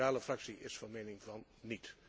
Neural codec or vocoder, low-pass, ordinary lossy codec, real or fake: none; none; none; real